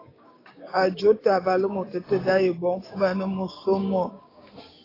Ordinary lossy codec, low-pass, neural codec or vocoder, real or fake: AAC, 24 kbps; 5.4 kHz; codec, 16 kHz, 6 kbps, DAC; fake